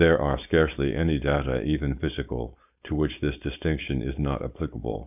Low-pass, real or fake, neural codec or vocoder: 3.6 kHz; fake; codec, 16 kHz, 4.8 kbps, FACodec